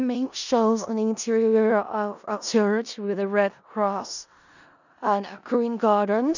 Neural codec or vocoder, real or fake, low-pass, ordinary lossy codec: codec, 16 kHz in and 24 kHz out, 0.4 kbps, LongCat-Audio-Codec, four codebook decoder; fake; 7.2 kHz; none